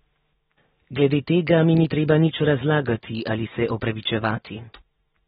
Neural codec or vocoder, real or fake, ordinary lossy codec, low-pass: none; real; AAC, 16 kbps; 19.8 kHz